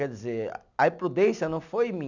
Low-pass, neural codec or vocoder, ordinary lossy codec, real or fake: 7.2 kHz; none; none; real